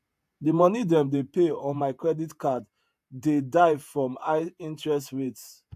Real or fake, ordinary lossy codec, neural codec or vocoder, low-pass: fake; AAC, 96 kbps; vocoder, 48 kHz, 128 mel bands, Vocos; 14.4 kHz